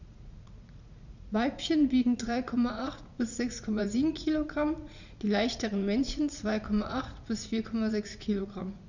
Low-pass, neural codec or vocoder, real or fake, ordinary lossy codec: 7.2 kHz; vocoder, 44.1 kHz, 80 mel bands, Vocos; fake; none